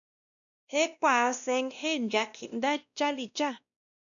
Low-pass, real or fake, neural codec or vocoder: 7.2 kHz; fake; codec, 16 kHz, 1 kbps, X-Codec, WavLM features, trained on Multilingual LibriSpeech